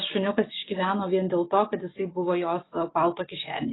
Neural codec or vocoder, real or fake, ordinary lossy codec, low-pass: none; real; AAC, 16 kbps; 7.2 kHz